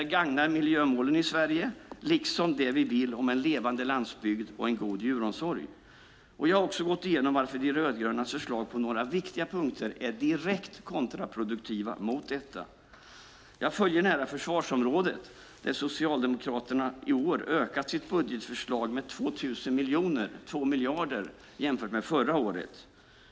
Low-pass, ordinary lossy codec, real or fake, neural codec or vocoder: none; none; real; none